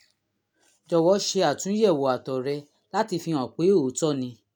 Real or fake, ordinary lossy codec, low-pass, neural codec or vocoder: real; none; none; none